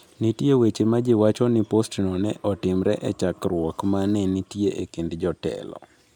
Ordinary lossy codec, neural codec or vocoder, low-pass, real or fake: none; none; 19.8 kHz; real